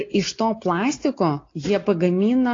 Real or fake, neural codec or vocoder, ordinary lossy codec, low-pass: real; none; AAC, 32 kbps; 7.2 kHz